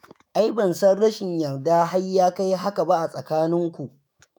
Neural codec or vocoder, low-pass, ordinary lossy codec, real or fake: autoencoder, 48 kHz, 128 numbers a frame, DAC-VAE, trained on Japanese speech; none; none; fake